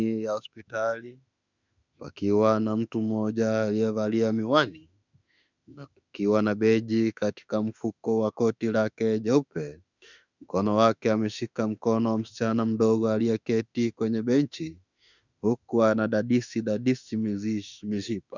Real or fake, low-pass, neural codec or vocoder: fake; 7.2 kHz; autoencoder, 48 kHz, 32 numbers a frame, DAC-VAE, trained on Japanese speech